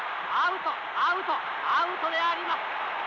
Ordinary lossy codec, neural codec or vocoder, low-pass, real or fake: none; none; 7.2 kHz; real